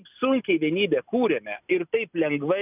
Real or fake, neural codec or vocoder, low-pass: real; none; 3.6 kHz